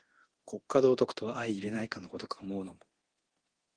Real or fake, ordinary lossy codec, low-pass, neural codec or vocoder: fake; Opus, 16 kbps; 9.9 kHz; codec, 24 kHz, 0.9 kbps, DualCodec